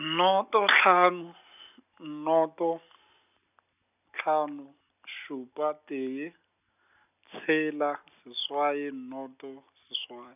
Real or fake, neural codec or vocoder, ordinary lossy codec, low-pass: real; none; none; 3.6 kHz